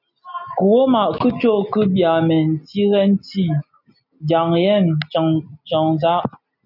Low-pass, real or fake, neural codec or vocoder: 5.4 kHz; real; none